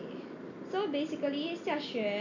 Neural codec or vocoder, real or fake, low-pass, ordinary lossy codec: none; real; 7.2 kHz; none